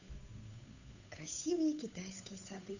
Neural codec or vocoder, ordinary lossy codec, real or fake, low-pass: codec, 16 kHz, 8 kbps, FunCodec, trained on Chinese and English, 25 frames a second; none; fake; 7.2 kHz